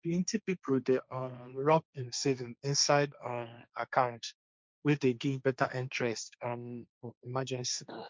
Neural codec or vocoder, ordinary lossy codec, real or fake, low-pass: codec, 16 kHz, 1.1 kbps, Voila-Tokenizer; none; fake; none